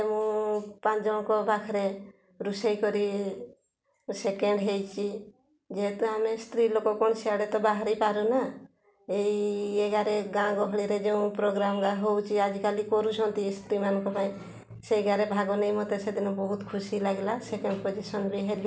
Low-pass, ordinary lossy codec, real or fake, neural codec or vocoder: none; none; real; none